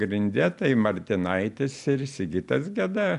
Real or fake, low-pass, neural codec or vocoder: real; 10.8 kHz; none